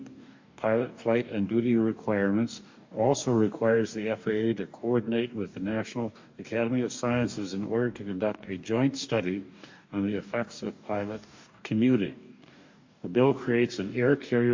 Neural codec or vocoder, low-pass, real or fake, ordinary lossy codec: codec, 44.1 kHz, 2.6 kbps, DAC; 7.2 kHz; fake; MP3, 48 kbps